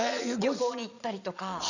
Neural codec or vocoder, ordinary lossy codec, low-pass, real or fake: vocoder, 22.05 kHz, 80 mel bands, Vocos; none; 7.2 kHz; fake